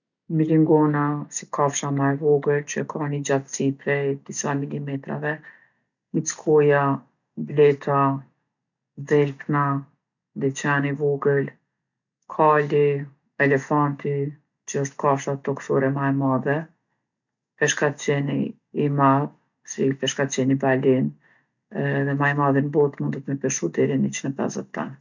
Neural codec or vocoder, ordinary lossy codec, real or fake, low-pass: none; none; real; 7.2 kHz